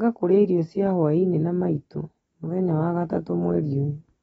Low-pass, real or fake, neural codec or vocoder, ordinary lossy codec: 19.8 kHz; real; none; AAC, 24 kbps